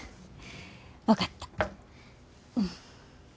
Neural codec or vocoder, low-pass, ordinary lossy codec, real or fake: none; none; none; real